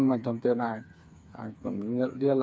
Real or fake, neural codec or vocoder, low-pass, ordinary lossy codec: fake; codec, 16 kHz, 4 kbps, FreqCodec, smaller model; none; none